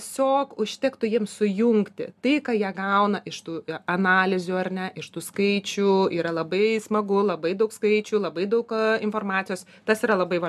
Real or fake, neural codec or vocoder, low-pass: real; none; 14.4 kHz